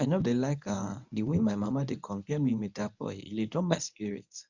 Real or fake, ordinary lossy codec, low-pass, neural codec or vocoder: fake; none; 7.2 kHz; codec, 24 kHz, 0.9 kbps, WavTokenizer, medium speech release version 1